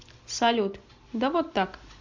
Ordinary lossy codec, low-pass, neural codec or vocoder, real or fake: MP3, 64 kbps; 7.2 kHz; none; real